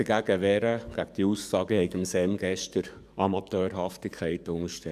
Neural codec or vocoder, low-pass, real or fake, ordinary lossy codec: codec, 44.1 kHz, 7.8 kbps, DAC; 14.4 kHz; fake; none